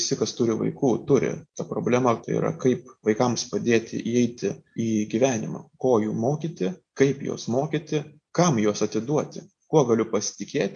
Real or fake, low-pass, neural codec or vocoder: real; 9.9 kHz; none